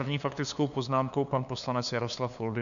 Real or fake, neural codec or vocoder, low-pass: fake; codec, 16 kHz, 2 kbps, FunCodec, trained on LibriTTS, 25 frames a second; 7.2 kHz